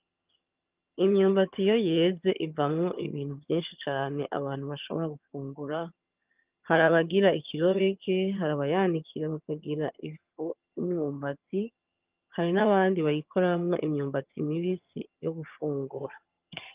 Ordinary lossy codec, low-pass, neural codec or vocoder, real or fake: Opus, 24 kbps; 3.6 kHz; vocoder, 22.05 kHz, 80 mel bands, HiFi-GAN; fake